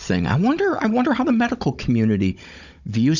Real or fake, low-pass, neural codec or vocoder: fake; 7.2 kHz; codec, 16 kHz, 16 kbps, FunCodec, trained on Chinese and English, 50 frames a second